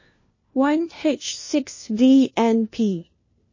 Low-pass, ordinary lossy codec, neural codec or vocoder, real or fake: 7.2 kHz; MP3, 32 kbps; codec, 16 kHz, 1 kbps, FunCodec, trained on LibriTTS, 50 frames a second; fake